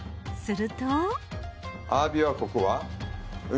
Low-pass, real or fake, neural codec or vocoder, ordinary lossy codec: none; real; none; none